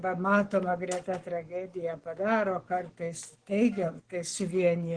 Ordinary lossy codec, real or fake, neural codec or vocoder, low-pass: Opus, 16 kbps; fake; codec, 44.1 kHz, 7.8 kbps, Pupu-Codec; 9.9 kHz